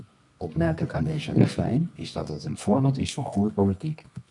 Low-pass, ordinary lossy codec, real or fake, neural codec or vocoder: 10.8 kHz; MP3, 96 kbps; fake; codec, 24 kHz, 0.9 kbps, WavTokenizer, medium music audio release